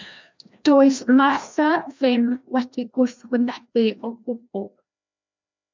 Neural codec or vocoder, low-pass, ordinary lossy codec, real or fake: codec, 16 kHz, 1 kbps, FreqCodec, larger model; 7.2 kHz; MP3, 64 kbps; fake